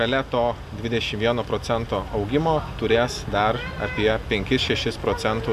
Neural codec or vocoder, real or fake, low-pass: none; real; 14.4 kHz